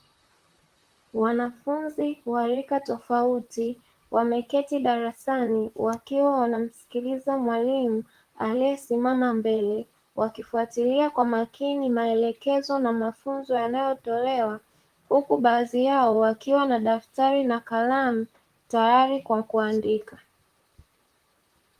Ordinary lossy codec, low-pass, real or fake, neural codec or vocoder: Opus, 24 kbps; 14.4 kHz; fake; vocoder, 44.1 kHz, 128 mel bands, Pupu-Vocoder